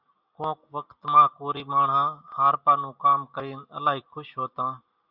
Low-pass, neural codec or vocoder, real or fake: 5.4 kHz; none; real